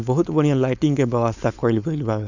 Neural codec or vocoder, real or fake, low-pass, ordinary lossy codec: codec, 16 kHz, 4.8 kbps, FACodec; fake; 7.2 kHz; none